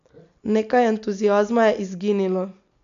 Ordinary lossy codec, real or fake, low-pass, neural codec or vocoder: MP3, 64 kbps; real; 7.2 kHz; none